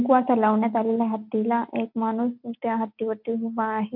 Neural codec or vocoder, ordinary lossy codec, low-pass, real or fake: none; AAC, 48 kbps; 5.4 kHz; real